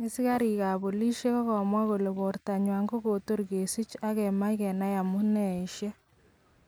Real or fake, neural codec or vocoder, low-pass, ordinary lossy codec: real; none; none; none